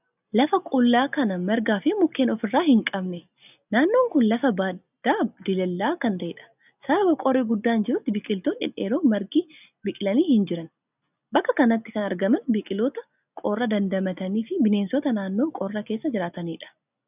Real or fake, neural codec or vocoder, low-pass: real; none; 3.6 kHz